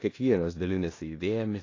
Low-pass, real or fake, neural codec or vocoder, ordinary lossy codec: 7.2 kHz; fake; codec, 16 kHz in and 24 kHz out, 0.4 kbps, LongCat-Audio-Codec, four codebook decoder; AAC, 32 kbps